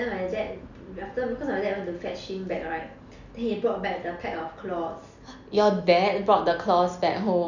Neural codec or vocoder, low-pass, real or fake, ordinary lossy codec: none; 7.2 kHz; real; none